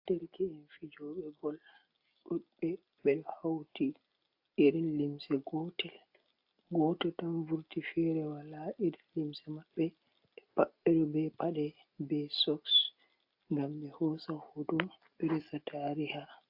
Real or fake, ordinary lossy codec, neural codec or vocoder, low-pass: real; AAC, 48 kbps; none; 5.4 kHz